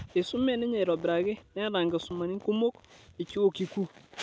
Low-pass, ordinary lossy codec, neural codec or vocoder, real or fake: none; none; none; real